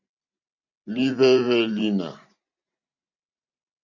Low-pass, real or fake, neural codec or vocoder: 7.2 kHz; fake; vocoder, 44.1 kHz, 128 mel bands every 512 samples, BigVGAN v2